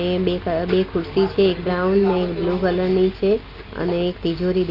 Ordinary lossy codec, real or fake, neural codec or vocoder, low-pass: Opus, 24 kbps; real; none; 5.4 kHz